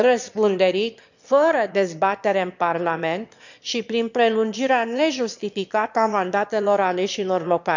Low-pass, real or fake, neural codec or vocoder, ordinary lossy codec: 7.2 kHz; fake; autoencoder, 22.05 kHz, a latent of 192 numbers a frame, VITS, trained on one speaker; none